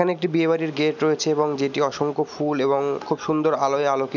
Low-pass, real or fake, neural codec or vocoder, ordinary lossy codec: 7.2 kHz; real; none; none